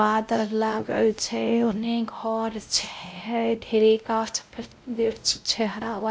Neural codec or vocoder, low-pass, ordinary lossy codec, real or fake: codec, 16 kHz, 0.5 kbps, X-Codec, WavLM features, trained on Multilingual LibriSpeech; none; none; fake